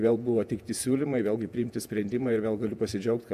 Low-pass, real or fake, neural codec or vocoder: 14.4 kHz; fake; vocoder, 48 kHz, 128 mel bands, Vocos